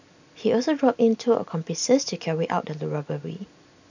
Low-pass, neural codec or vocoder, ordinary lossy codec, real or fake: 7.2 kHz; none; none; real